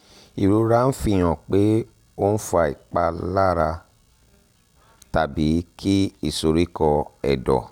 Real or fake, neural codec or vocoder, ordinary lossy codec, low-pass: real; none; none; 19.8 kHz